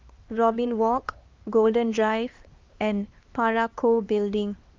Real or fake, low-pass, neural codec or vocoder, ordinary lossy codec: fake; 7.2 kHz; codec, 16 kHz, 2 kbps, X-Codec, HuBERT features, trained on LibriSpeech; Opus, 32 kbps